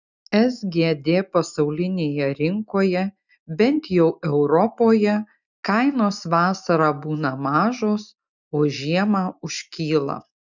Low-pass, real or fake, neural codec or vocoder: 7.2 kHz; real; none